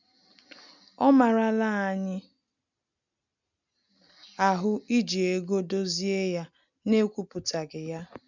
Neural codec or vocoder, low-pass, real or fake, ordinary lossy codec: none; 7.2 kHz; real; none